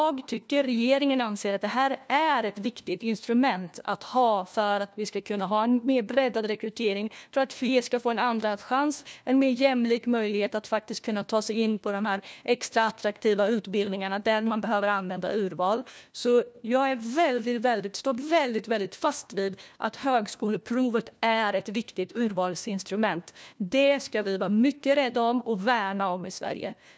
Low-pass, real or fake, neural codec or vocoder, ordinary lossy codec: none; fake; codec, 16 kHz, 1 kbps, FunCodec, trained on LibriTTS, 50 frames a second; none